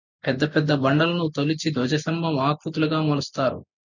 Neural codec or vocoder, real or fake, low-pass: none; real; 7.2 kHz